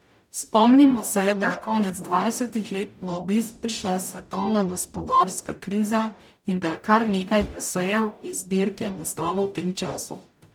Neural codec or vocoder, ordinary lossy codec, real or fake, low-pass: codec, 44.1 kHz, 0.9 kbps, DAC; none; fake; 19.8 kHz